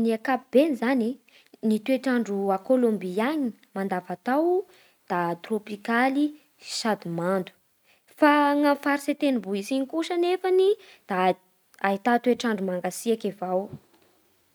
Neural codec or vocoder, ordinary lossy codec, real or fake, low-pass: none; none; real; none